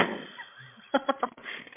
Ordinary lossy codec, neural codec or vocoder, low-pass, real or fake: MP3, 32 kbps; codec, 16 kHz, 16 kbps, FreqCodec, larger model; 3.6 kHz; fake